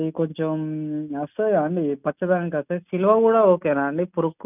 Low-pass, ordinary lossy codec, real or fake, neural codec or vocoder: 3.6 kHz; none; real; none